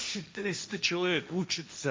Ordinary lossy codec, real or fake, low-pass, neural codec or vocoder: none; fake; none; codec, 16 kHz, 1.1 kbps, Voila-Tokenizer